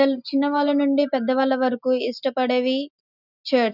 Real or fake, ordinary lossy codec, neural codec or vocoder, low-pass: real; none; none; 5.4 kHz